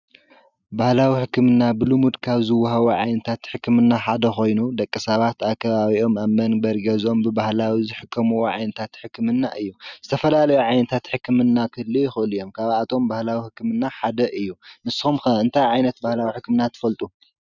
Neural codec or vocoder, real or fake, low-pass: none; real; 7.2 kHz